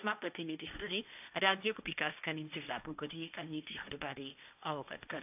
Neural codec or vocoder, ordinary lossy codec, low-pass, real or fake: codec, 16 kHz, 1.1 kbps, Voila-Tokenizer; AAC, 32 kbps; 3.6 kHz; fake